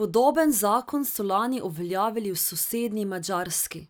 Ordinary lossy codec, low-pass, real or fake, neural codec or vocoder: none; none; real; none